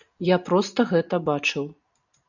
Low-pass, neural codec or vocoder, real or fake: 7.2 kHz; none; real